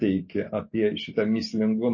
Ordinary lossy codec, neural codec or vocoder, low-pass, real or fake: MP3, 32 kbps; none; 7.2 kHz; real